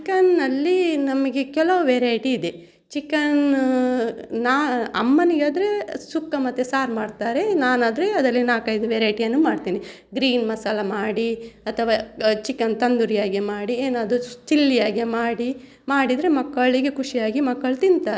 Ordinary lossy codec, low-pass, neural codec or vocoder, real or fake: none; none; none; real